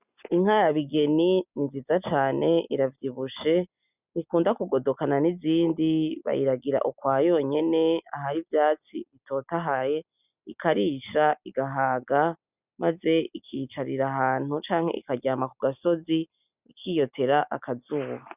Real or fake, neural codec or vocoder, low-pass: real; none; 3.6 kHz